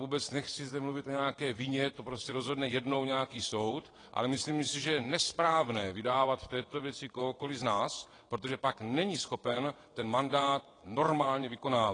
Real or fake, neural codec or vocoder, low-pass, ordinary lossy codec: fake; vocoder, 22.05 kHz, 80 mel bands, WaveNeXt; 9.9 kHz; AAC, 32 kbps